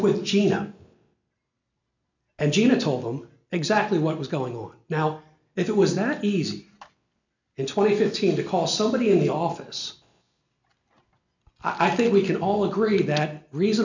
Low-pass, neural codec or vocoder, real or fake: 7.2 kHz; none; real